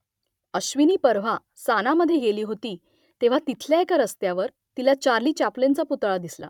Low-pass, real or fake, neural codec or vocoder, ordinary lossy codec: 19.8 kHz; fake; vocoder, 44.1 kHz, 128 mel bands every 512 samples, BigVGAN v2; none